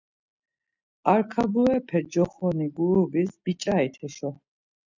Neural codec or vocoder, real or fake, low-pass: none; real; 7.2 kHz